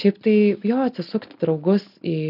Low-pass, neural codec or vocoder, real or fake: 5.4 kHz; none; real